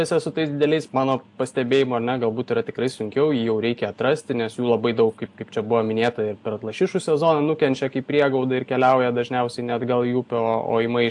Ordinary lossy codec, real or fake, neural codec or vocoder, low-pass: AAC, 64 kbps; real; none; 10.8 kHz